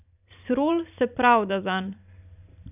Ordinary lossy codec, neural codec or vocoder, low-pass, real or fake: none; vocoder, 44.1 kHz, 128 mel bands every 512 samples, BigVGAN v2; 3.6 kHz; fake